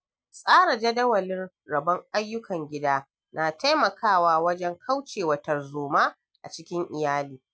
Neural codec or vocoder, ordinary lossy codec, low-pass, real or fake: none; none; none; real